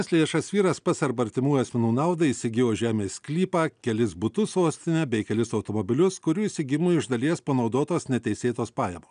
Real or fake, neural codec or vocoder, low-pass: real; none; 9.9 kHz